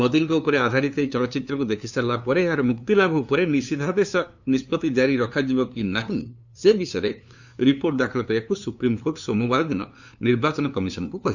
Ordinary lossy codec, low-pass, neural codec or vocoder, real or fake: none; 7.2 kHz; codec, 16 kHz, 2 kbps, FunCodec, trained on LibriTTS, 25 frames a second; fake